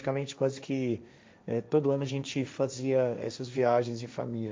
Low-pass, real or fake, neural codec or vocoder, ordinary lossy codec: none; fake; codec, 16 kHz, 1.1 kbps, Voila-Tokenizer; none